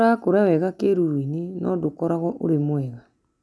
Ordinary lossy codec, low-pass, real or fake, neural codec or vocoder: none; none; real; none